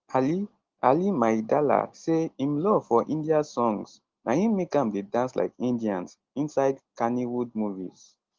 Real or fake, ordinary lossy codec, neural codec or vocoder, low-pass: real; Opus, 16 kbps; none; 7.2 kHz